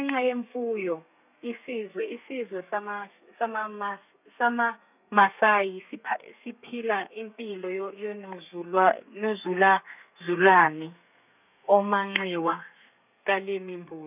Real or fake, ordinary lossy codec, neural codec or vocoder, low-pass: fake; none; codec, 32 kHz, 1.9 kbps, SNAC; 3.6 kHz